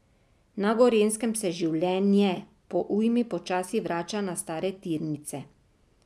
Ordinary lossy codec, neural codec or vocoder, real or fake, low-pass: none; none; real; none